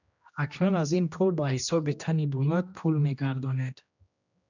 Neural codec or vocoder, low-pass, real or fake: codec, 16 kHz, 1 kbps, X-Codec, HuBERT features, trained on general audio; 7.2 kHz; fake